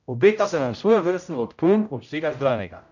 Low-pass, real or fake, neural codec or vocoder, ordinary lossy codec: 7.2 kHz; fake; codec, 16 kHz, 0.5 kbps, X-Codec, HuBERT features, trained on general audio; none